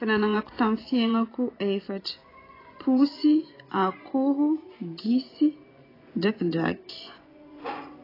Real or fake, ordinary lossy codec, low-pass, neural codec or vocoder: fake; AAC, 24 kbps; 5.4 kHz; vocoder, 24 kHz, 100 mel bands, Vocos